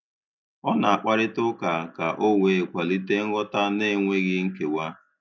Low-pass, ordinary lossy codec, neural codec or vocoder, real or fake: none; none; none; real